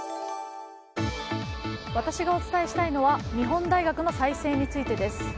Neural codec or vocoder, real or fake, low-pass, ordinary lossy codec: none; real; none; none